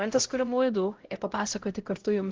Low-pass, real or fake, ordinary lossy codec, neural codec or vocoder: 7.2 kHz; fake; Opus, 16 kbps; codec, 16 kHz, 0.5 kbps, X-Codec, HuBERT features, trained on LibriSpeech